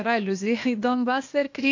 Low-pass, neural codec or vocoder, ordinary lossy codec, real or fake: 7.2 kHz; codec, 16 kHz, 0.8 kbps, ZipCodec; AAC, 48 kbps; fake